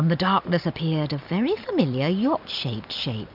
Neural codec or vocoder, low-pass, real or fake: none; 5.4 kHz; real